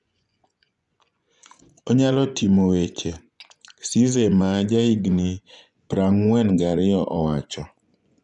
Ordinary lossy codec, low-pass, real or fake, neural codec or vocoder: none; 10.8 kHz; fake; vocoder, 24 kHz, 100 mel bands, Vocos